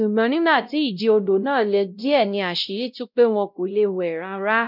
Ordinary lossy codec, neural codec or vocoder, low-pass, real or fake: none; codec, 16 kHz, 0.5 kbps, X-Codec, WavLM features, trained on Multilingual LibriSpeech; 5.4 kHz; fake